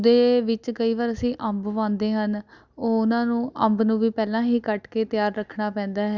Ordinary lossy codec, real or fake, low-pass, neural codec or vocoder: Opus, 64 kbps; real; 7.2 kHz; none